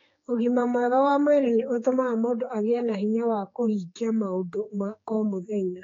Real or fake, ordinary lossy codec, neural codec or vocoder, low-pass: fake; AAC, 32 kbps; codec, 16 kHz, 4 kbps, X-Codec, HuBERT features, trained on general audio; 7.2 kHz